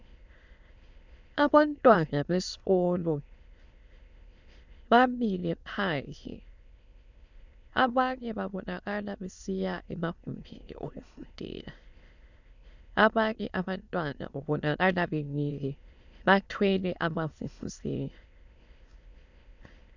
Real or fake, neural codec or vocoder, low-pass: fake; autoencoder, 22.05 kHz, a latent of 192 numbers a frame, VITS, trained on many speakers; 7.2 kHz